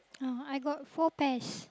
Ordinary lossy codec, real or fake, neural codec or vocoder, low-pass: none; real; none; none